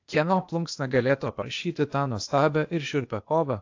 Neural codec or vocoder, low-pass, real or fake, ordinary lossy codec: codec, 16 kHz, 0.8 kbps, ZipCodec; 7.2 kHz; fake; AAC, 48 kbps